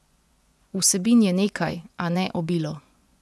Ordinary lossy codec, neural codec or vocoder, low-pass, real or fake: none; vocoder, 24 kHz, 100 mel bands, Vocos; none; fake